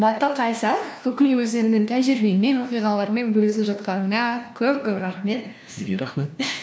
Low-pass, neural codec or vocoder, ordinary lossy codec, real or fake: none; codec, 16 kHz, 1 kbps, FunCodec, trained on LibriTTS, 50 frames a second; none; fake